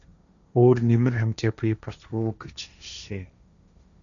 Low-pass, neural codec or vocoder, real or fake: 7.2 kHz; codec, 16 kHz, 1.1 kbps, Voila-Tokenizer; fake